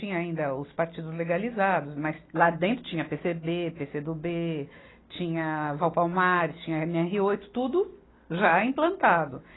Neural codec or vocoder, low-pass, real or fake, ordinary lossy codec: none; 7.2 kHz; real; AAC, 16 kbps